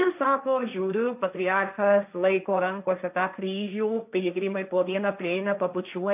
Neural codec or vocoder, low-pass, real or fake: codec, 16 kHz, 1.1 kbps, Voila-Tokenizer; 3.6 kHz; fake